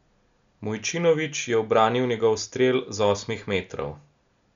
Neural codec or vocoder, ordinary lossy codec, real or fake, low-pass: none; MP3, 64 kbps; real; 7.2 kHz